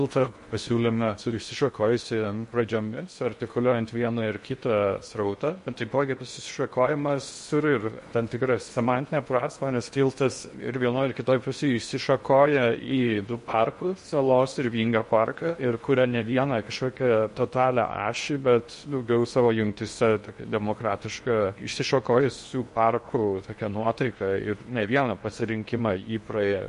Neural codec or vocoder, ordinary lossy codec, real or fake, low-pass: codec, 16 kHz in and 24 kHz out, 0.8 kbps, FocalCodec, streaming, 65536 codes; MP3, 48 kbps; fake; 10.8 kHz